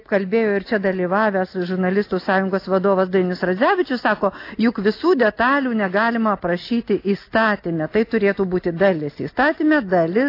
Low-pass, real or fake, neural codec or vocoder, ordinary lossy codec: 5.4 kHz; real; none; AAC, 32 kbps